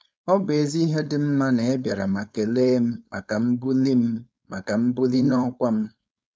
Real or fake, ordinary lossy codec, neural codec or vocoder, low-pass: fake; none; codec, 16 kHz, 4.8 kbps, FACodec; none